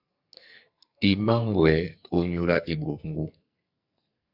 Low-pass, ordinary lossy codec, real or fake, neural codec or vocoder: 5.4 kHz; AAC, 48 kbps; fake; codec, 44.1 kHz, 2.6 kbps, SNAC